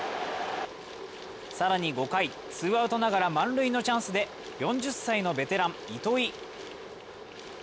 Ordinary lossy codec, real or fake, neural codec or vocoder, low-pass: none; real; none; none